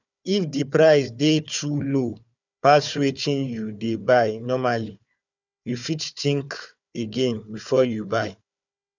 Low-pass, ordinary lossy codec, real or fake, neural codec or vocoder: 7.2 kHz; none; fake; codec, 16 kHz, 4 kbps, FunCodec, trained on Chinese and English, 50 frames a second